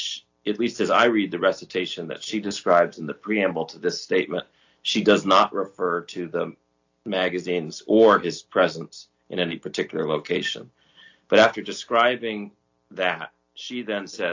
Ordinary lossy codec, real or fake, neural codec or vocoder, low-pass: AAC, 48 kbps; real; none; 7.2 kHz